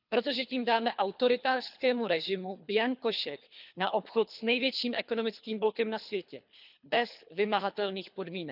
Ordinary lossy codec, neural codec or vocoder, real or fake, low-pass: none; codec, 24 kHz, 3 kbps, HILCodec; fake; 5.4 kHz